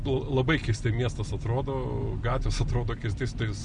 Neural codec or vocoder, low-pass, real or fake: none; 9.9 kHz; real